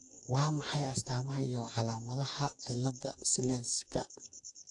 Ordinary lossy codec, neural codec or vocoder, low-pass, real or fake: AAC, 64 kbps; codec, 44.1 kHz, 2.6 kbps, DAC; 10.8 kHz; fake